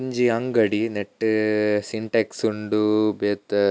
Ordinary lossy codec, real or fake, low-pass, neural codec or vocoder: none; real; none; none